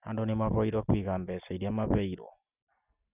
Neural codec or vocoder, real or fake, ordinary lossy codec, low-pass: none; real; none; 3.6 kHz